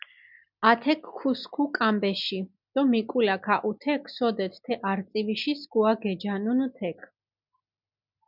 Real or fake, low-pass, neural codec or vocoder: real; 5.4 kHz; none